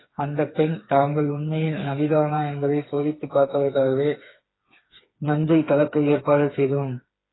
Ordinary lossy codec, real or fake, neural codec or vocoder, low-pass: AAC, 16 kbps; fake; codec, 16 kHz, 4 kbps, FreqCodec, smaller model; 7.2 kHz